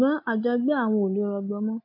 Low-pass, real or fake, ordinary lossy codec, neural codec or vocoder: 5.4 kHz; real; AAC, 48 kbps; none